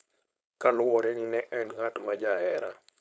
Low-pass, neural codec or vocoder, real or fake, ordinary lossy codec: none; codec, 16 kHz, 4.8 kbps, FACodec; fake; none